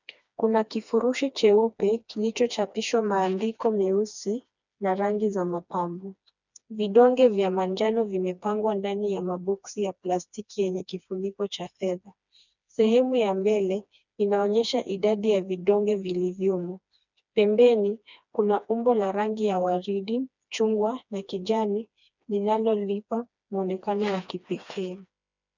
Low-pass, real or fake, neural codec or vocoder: 7.2 kHz; fake; codec, 16 kHz, 2 kbps, FreqCodec, smaller model